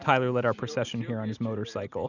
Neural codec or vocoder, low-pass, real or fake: none; 7.2 kHz; real